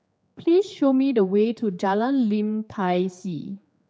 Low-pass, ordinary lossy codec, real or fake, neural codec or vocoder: none; none; fake; codec, 16 kHz, 4 kbps, X-Codec, HuBERT features, trained on general audio